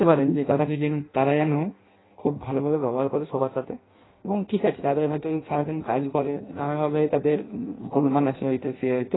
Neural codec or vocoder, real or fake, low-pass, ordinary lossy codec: codec, 16 kHz in and 24 kHz out, 0.6 kbps, FireRedTTS-2 codec; fake; 7.2 kHz; AAC, 16 kbps